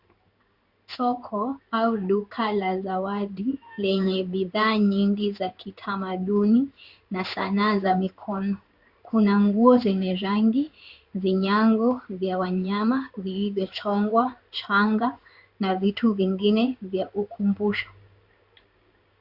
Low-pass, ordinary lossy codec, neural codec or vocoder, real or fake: 5.4 kHz; Opus, 64 kbps; codec, 16 kHz in and 24 kHz out, 1 kbps, XY-Tokenizer; fake